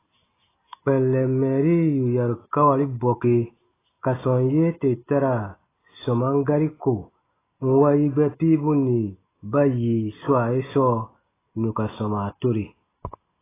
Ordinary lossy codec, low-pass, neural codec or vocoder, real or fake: AAC, 16 kbps; 3.6 kHz; none; real